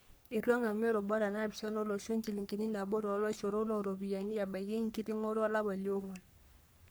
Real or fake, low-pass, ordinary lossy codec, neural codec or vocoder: fake; none; none; codec, 44.1 kHz, 3.4 kbps, Pupu-Codec